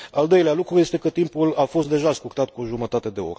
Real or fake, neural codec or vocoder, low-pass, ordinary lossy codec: real; none; none; none